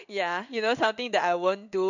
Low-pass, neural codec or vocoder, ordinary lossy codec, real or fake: 7.2 kHz; codec, 16 kHz in and 24 kHz out, 1 kbps, XY-Tokenizer; none; fake